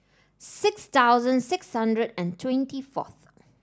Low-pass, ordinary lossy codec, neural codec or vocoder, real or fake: none; none; none; real